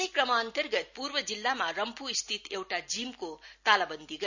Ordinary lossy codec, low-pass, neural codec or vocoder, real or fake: none; 7.2 kHz; none; real